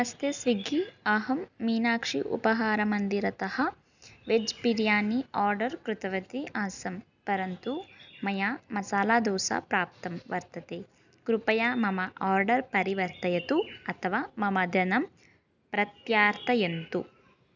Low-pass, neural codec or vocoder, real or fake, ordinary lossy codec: 7.2 kHz; none; real; none